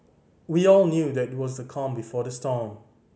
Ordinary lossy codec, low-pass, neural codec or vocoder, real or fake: none; none; none; real